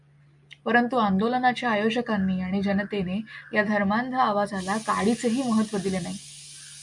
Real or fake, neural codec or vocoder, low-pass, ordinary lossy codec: real; none; 10.8 kHz; MP3, 96 kbps